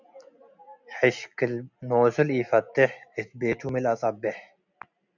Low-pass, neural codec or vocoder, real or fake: 7.2 kHz; none; real